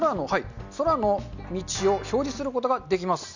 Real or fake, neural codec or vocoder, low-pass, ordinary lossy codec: real; none; 7.2 kHz; none